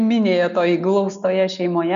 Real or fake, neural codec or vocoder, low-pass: real; none; 7.2 kHz